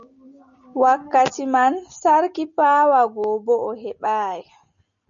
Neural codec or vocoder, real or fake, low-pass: none; real; 7.2 kHz